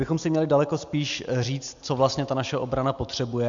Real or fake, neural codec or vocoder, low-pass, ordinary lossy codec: real; none; 7.2 kHz; MP3, 64 kbps